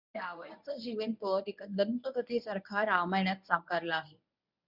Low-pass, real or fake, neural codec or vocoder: 5.4 kHz; fake; codec, 24 kHz, 0.9 kbps, WavTokenizer, medium speech release version 1